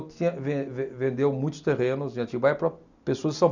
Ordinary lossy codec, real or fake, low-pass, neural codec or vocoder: none; real; 7.2 kHz; none